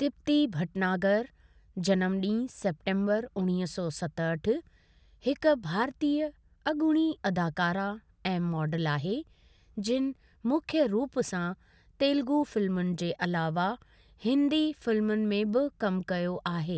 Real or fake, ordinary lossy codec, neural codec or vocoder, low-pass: real; none; none; none